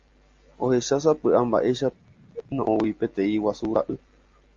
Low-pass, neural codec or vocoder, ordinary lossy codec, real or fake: 7.2 kHz; none; Opus, 32 kbps; real